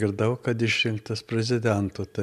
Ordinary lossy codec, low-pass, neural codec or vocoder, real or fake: MP3, 96 kbps; 14.4 kHz; none; real